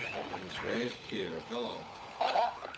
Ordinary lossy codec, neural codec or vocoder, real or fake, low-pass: none; codec, 16 kHz, 16 kbps, FunCodec, trained on LibriTTS, 50 frames a second; fake; none